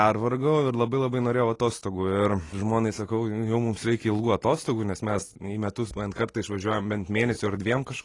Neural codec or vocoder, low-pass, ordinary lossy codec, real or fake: none; 10.8 kHz; AAC, 32 kbps; real